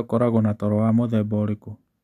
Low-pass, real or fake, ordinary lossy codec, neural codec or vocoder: 14.4 kHz; real; none; none